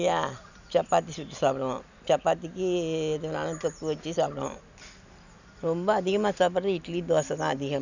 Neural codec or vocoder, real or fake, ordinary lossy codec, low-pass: none; real; none; 7.2 kHz